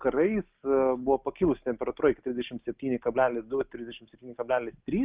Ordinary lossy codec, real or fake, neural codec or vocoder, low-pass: Opus, 32 kbps; real; none; 3.6 kHz